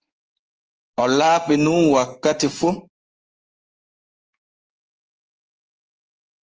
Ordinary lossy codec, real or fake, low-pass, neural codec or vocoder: Opus, 24 kbps; fake; 7.2 kHz; codec, 16 kHz in and 24 kHz out, 1 kbps, XY-Tokenizer